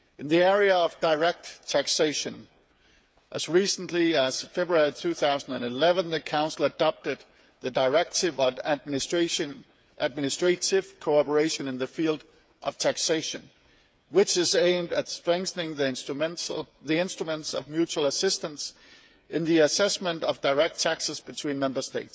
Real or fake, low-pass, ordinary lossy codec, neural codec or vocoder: fake; none; none; codec, 16 kHz, 8 kbps, FreqCodec, smaller model